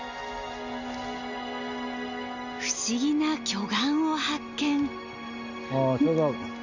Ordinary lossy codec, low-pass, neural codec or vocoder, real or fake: Opus, 64 kbps; 7.2 kHz; none; real